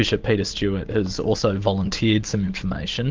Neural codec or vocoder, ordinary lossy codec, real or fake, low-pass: none; Opus, 32 kbps; real; 7.2 kHz